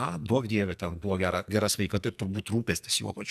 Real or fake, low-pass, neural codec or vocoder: fake; 14.4 kHz; codec, 44.1 kHz, 2.6 kbps, SNAC